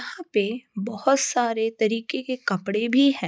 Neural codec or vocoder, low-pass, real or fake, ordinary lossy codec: none; none; real; none